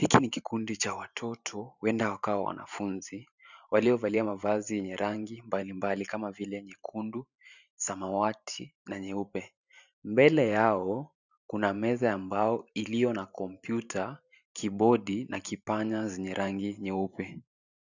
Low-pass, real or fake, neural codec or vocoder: 7.2 kHz; fake; vocoder, 44.1 kHz, 128 mel bands every 512 samples, BigVGAN v2